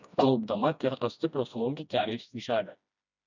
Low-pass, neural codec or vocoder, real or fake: 7.2 kHz; codec, 16 kHz, 1 kbps, FreqCodec, smaller model; fake